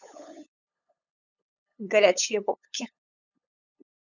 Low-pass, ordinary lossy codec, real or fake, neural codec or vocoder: 7.2 kHz; none; fake; codec, 16 kHz, 8 kbps, FunCodec, trained on LibriTTS, 25 frames a second